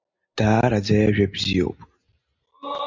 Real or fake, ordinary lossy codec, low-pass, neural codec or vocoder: real; MP3, 48 kbps; 7.2 kHz; none